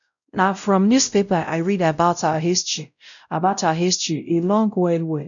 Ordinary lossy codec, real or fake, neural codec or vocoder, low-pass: none; fake; codec, 16 kHz, 0.5 kbps, X-Codec, WavLM features, trained on Multilingual LibriSpeech; 7.2 kHz